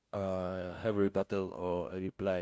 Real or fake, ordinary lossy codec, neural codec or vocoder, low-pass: fake; none; codec, 16 kHz, 0.5 kbps, FunCodec, trained on LibriTTS, 25 frames a second; none